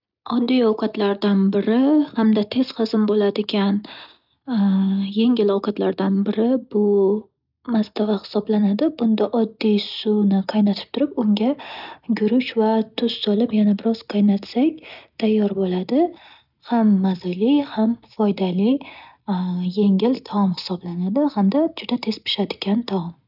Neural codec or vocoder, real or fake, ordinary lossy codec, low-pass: none; real; none; 5.4 kHz